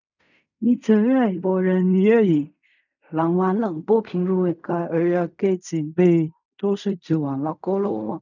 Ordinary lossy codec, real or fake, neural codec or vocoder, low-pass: none; fake; codec, 16 kHz in and 24 kHz out, 0.4 kbps, LongCat-Audio-Codec, fine tuned four codebook decoder; 7.2 kHz